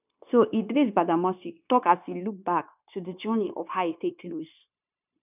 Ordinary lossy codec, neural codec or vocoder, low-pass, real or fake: none; codec, 16 kHz, 0.9 kbps, LongCat-Audio-Codec; 3.6 kHz; fake